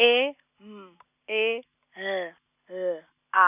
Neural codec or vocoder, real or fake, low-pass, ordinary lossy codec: none; real; 3.6 kHz; none